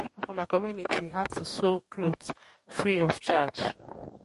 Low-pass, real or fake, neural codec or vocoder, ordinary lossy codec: 14.4 kHz; fake; codec, 44.1 kHz, 2.6 kbps, DAC; MP3, 48 kbps